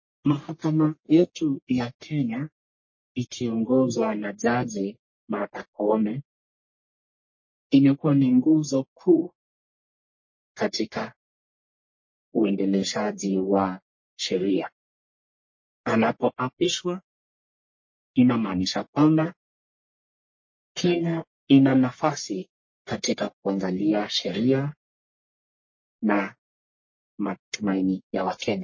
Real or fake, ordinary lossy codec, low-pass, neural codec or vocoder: fake; MP3, 32 kbps; 7.2 kHz; codec, 44.1 kHz, 1.7 kbps, Pupu-Codec